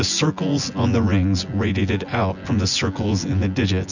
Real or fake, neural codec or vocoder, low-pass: fake; vocoder, 24 kHz, 100 mel bands, Vocos; 7.2 kHz